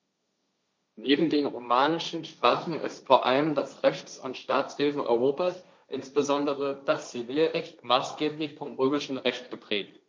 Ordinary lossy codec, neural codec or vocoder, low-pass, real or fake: none; codec, 16 kHz, 1.1 kbps, Voila-Tokenizer; none; fake